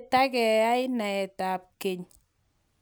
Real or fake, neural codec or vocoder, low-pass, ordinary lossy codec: real; none; none; none